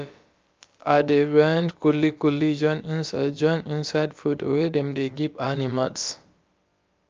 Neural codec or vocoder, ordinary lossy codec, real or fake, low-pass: codec, 16 kHz, about 1 kbps, DyCAST, with the encoder's durations; Opus, 24 kbps; fake; 7.2 kHz